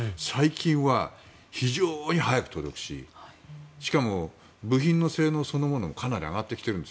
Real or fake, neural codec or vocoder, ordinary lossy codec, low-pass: real; none; none; none